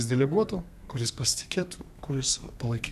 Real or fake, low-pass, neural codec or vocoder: fake; 14.4 kHz; codec, 32 kHz, 1.9 kbps, SNAC